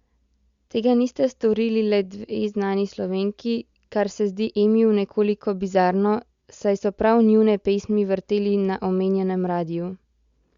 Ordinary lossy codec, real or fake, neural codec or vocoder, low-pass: Opus, 64 kbps; real; none; 7.2 kHz